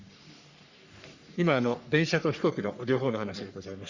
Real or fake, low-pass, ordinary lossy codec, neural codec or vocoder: fake; 7.2 kHz; Opus, 64 kbps; codec, 44.1 kHz, 3.4 kbps, Pupu-Codec